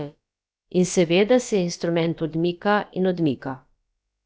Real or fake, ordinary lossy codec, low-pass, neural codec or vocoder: fake; none; none; codec, 16 kHz, about 1 kbps, DyCAST, with the encoder's durations